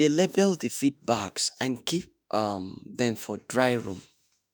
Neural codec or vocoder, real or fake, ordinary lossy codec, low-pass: autoencoder, 48 kHz, 32 numbers a frame, DAC-VAE, trained on Japanese speech; fake; none; none